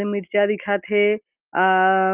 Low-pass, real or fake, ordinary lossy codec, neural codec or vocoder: 3.6 kHz; real; Opus, 32 kbps; none